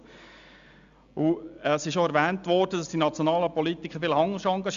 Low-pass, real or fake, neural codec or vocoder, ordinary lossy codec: 7.2 kHz; real; none; Opus, 64 kbps